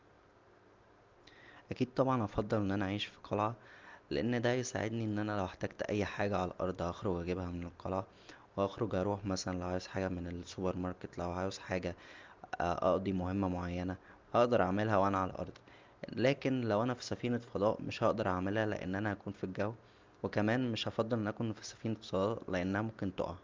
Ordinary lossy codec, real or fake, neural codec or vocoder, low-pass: Opus, 24 kbps; real; none; 7.2 kHz